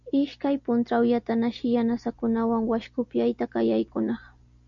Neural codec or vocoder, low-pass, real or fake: none; 7.2 kHz; real